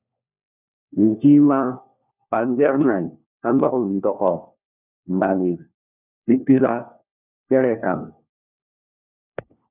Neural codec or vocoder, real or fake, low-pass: codec, 16 kHz, 1 kbps, FunCodec, trained on LibriTTS, 50 frames a second; fake; 3.6 kHz